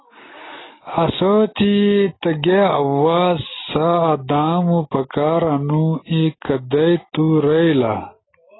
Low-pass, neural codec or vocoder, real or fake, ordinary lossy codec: 7.2 kHz; none; real; AAC, 16 kbps